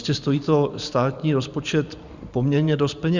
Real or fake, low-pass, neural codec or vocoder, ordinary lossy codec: fake; 7.2 kHz; vocoder, 44.1 kHz, 128 mel bands every 512 samples, BigVGAN v2; Opus, 64 kbps